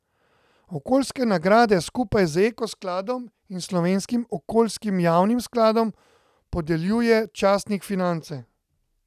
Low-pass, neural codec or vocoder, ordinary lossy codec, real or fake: 14.4 kHz; none; none; real